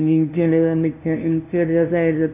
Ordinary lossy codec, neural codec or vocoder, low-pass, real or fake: none; codec, 16 kHz, 0.5 kbps, FunCodec, trained on LibriTTS, 25 frames a second; 3.6 kHz; fake